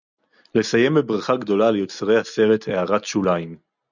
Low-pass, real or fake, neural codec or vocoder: 7.2 kHz; real; none